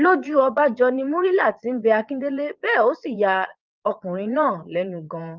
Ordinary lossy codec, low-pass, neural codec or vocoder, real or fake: Opus, 32 kbps; 7.2 kHz; vocoder, 22.05 kHz, 80 mel bands, Vocos; fake